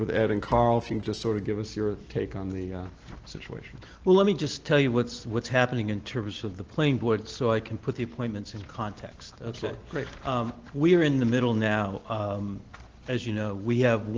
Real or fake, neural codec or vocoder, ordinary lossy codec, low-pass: real; none; Opus, 16 kbps; 7.2 kHz